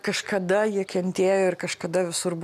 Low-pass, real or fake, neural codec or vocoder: 14.4 kHz; real; none